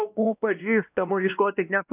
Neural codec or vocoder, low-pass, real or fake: codec, 16 kHz, 1 kbps, X-Codec, WavLM features, trained on Multilingual LibriSpeech; 3.6 kHz; fake